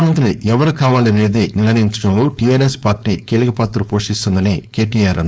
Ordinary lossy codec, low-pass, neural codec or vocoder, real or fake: none; none; codec, 16 kHz, 4.8 kbps, FACodec; fake